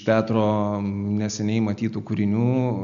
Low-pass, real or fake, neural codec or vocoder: 7.2 kHz; real; none